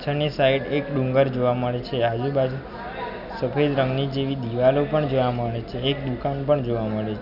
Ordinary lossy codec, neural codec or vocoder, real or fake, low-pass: none; none; real; 5.4 kHz